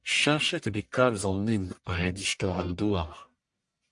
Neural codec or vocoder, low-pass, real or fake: codec, 44.1 kHz, 1.7 kbps, Pupu-Codec; 10.8 kHz; fake